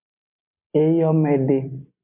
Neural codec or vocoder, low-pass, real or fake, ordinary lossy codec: none; 3.6 kHz; real; MP3, 32 kbps